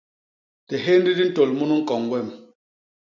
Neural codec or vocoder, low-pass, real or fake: none; 7.2 kHz; real